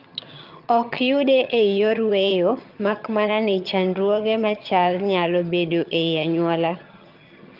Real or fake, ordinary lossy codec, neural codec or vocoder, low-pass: fake; Opus, 24 kbps; vocoder, 22.05 kHz, 80 mel bands, HiFi-GAN; 5.4 kHz